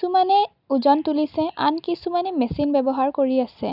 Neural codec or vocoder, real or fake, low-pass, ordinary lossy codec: none; real; 5.4 kHz; none